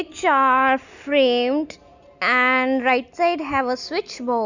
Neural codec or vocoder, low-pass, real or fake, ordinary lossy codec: none; 7.2 kHz; real; none